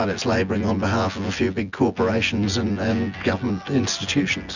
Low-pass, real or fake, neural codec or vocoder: 7.2 kHz; fake; vocoder, 24 kHz, 100 mel bands, Vocos